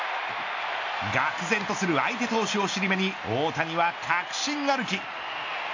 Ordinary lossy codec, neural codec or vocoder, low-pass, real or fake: AAC, 48 kbps; none; 7.2 kHz; real